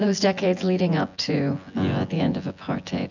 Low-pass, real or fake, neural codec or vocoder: 7.2 kHz; fake; vocoder, 24 kHz, 100 mel bands, Vocos